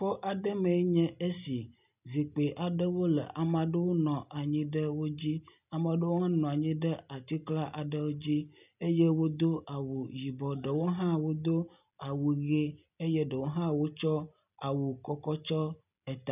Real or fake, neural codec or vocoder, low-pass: real; none; 3.6 kHz